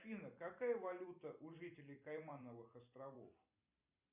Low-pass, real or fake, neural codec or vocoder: 3.6 kHz; fake; vocoder, 44.1 kHz, 128 mel bands every 256 samples, BigVGAN v2